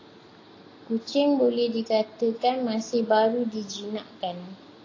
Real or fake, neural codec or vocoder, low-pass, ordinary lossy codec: real; none; 7.2 kHz; AAC, 32 kbps